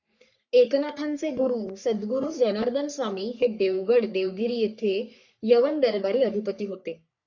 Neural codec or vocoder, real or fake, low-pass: codec, 44.1 kHz, 3.4 kbps, Pupu-Codec; fake; 7.2 kHz